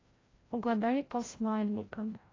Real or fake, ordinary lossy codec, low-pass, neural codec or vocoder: fake; AAC, 32 kbps; 7.2 kHz; codec, 16 kHz, 0.5 kbps, FreqCodec, larger model